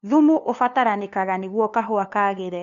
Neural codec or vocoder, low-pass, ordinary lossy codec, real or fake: codec, 16 kHz, 2 kbps, FunCodec, trained on LibriTTS, 25 frames a second; 7.2 kHz; none; fake